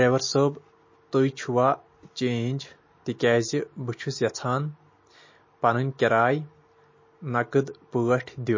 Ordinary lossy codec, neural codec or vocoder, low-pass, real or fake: MP3, 32 kbps; none; 7.2 kHz; real